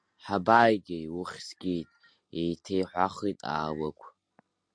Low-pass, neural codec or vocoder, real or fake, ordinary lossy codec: 9.9 kHz; none; real; MP3, 64 kbps